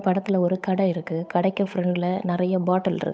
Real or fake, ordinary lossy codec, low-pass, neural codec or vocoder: fake; none; none; codec, 16 kHz, 8 kbps, FunCodec, trained on Chinese and English, 25 frames a second